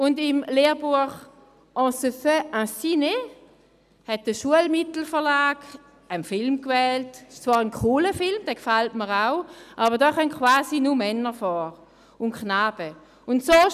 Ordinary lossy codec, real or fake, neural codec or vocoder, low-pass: none; real; none; 14.4 kHz